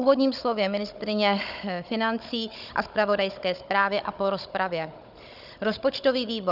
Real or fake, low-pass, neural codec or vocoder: fake; 5.4 kHz; codec, 16 kHz, 16 kbps, FunCodec, trained on Chinese and English, 50 frames a second